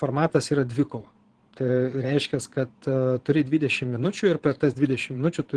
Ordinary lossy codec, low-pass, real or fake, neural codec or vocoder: Opus, 16 kbps; 9.9 kHz; fake; vocoder, 22.05 kHz, 80 mel bands, Vocos